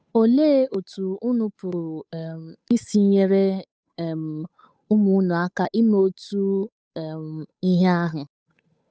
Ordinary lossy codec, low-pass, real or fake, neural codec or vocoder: none; none; fake; codec, 16 kHz, 8 kbps, FunCodec, trained on Chinese and English, 25 frames a second